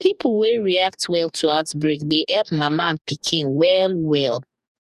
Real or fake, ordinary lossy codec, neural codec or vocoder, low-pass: fake; none; codec, 44.1 kHz, 2.6 kbps, DAC; 14.4 kHz